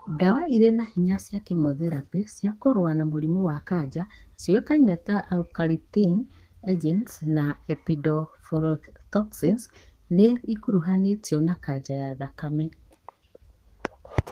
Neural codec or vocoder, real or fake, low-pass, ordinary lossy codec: codec, 32 kHz, 1.9 kbps, SNAC; fake; 14.4 kHz; Opus, 24 kbps